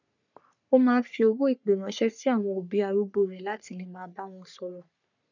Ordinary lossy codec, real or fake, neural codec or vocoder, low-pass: none; fake; codec, 44.1 kHz, 3.4 kbps, Pupu-Codec; 7.2 kHz